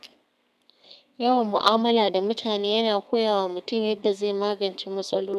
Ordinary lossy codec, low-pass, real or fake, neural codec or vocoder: none; 14.4 kHz; fake; codec, 32 kHz, 1.9 kbps, SNAC